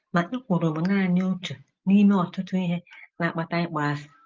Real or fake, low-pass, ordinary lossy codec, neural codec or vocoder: real; 7.2 kHz; Opus, 32 kbps; none